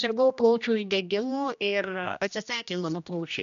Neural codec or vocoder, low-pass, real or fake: codec, 16 kHz, 1 kbps, X-Codec, HuBERT features, trained on general audio; 7.2 kHz; fake